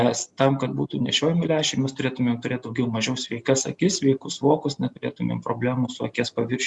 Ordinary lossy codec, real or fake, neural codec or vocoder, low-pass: Opus, 64 kbps; real; none; 10.8 kHz